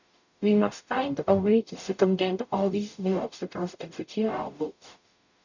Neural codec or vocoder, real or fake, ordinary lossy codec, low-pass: codec, 44.1 kHz, 0.9 kbps, DAC; fake; none; 7.2 kHz